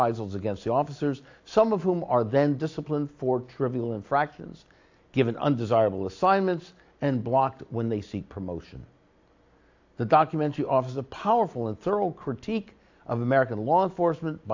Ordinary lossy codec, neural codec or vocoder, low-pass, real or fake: AAC, 48 kbps; none; 7.2 kHz; real